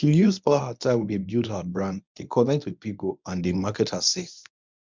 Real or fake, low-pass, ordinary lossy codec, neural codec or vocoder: fake; 7.2 kHz; MP3, 64 kbps; codec, 24 kHz, 0.9 kbps, WavTokenizer, medium speech release version 1